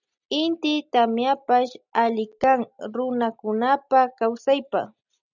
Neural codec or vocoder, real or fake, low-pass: none; real; 7.2 kHz